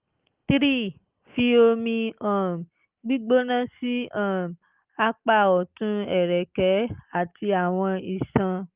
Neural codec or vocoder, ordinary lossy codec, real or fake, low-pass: none; Opus, 32 kbps; real; 3.6 kHz